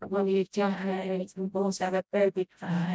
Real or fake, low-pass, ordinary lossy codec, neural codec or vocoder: fake; none; none; codec, 16 kHz, 0.5 kbps, FreqCodec, smaller model